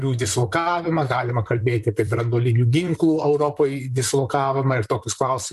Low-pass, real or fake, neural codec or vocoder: 14.4 kHz; fake; vocoder, 44.1 kHz, 128 mel bands, Pupu-Vocoder